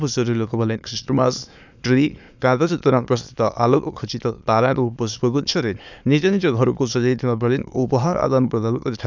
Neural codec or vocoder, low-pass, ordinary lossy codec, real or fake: autoencoder, 22.05 kHz, a latent of 192 numbers a frame, VITS, trained on many speakers; 7.2 kHz; none; fake